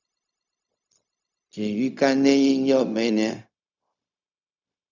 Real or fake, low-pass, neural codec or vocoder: fake; 7.2 kHz; codec, 16 kHz, 0.4 kbps, LongCat-Audio-Codec